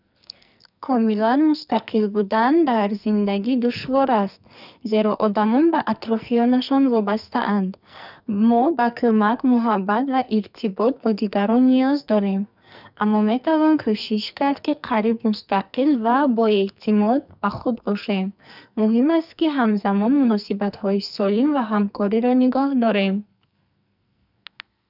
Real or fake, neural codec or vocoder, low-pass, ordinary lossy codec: fake; codec, 44.1 kHz, 2.6 kbps, SNAC; 5.4 kHz; none